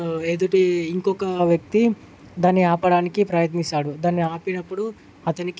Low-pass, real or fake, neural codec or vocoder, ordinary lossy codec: none; real; none; none